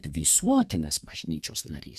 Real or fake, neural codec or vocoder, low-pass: fake; codec, 32 kHz, 1.9 kbps, SNAC; 14.4 kHz